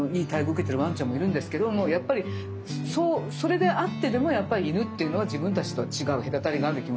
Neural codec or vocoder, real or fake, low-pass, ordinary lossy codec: none; real; none; none